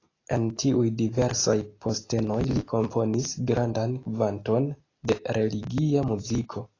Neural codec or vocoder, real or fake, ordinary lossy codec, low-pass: none; real; AAC, 32 kbps; 7.2 kHz